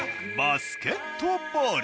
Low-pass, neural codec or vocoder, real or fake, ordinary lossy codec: none; none; real; none